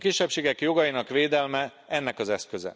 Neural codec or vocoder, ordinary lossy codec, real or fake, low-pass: none; none; real; none